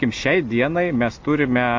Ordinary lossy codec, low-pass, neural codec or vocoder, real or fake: MP3, 48 kbps; 7.2 kHz; none; real